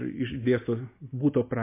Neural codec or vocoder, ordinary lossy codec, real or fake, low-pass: none; MP3, 24 kbps; real; 3.6 kHz